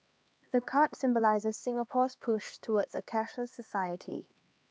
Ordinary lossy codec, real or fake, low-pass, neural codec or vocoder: none; fake; none; codec, 16 kHz, 2 kbps, X-Codec, HuBERT features, trained on LibriSpeech